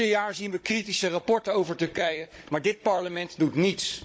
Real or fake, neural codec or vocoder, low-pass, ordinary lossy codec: fake; codec, 16 kHz, 16 kbps, FunCodec, trained on Chinese and English, 50 frames a second; none; none